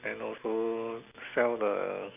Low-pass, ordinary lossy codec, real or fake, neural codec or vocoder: 3.6 kHz; none; real; none